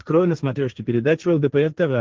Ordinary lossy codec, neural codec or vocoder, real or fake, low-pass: Opus, 16 kbps; codec, 16 kHz, 1.1 kbps, Voila-Tokenizer; fake; 7.2 kHz